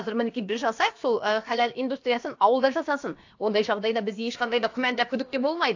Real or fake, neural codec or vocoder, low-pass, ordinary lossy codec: fake; codec, 16 kHz, about 1 kbps, DyCAST, with the encoder's durations; 7.2 kHz; AAC, 48 kbps